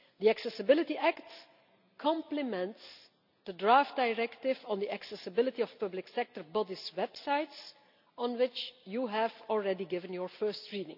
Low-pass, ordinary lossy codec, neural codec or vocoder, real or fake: 5.4 kHz; none; none; real